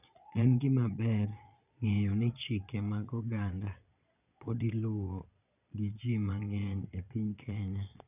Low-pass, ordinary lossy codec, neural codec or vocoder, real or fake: 3.6 kHz; none; vocoder, 22.05 kHz, 80 mel bands, Vocos; fake